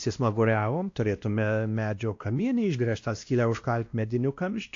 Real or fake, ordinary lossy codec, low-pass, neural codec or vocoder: fake; AAC, 48 kbps; 7.2 kHz; codec, 16 kHz, 1 kbps, X-Codec, WavLM features, trained on Multilingual LibriSpeech